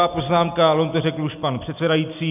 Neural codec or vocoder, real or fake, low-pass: none; real; 3.6 kHz